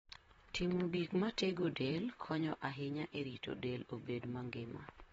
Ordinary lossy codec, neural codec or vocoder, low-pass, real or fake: AAC, 24 kbps; vocoder, 44.1 kHz, 128 mel bands, Pupu-Vocoder; 19.8 kHz; fake